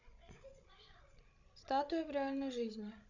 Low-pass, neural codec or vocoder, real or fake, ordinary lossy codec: 7.2 kHz; codec, 16 kHz, 16 kbps, FreqCodec, larger model; fake; none